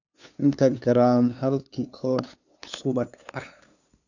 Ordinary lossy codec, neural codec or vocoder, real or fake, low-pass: none; codec, 16 kHz, 2 kbps, FunCodec, trained on LibriTTS, 25 frames a second; fake; 7.2 kHz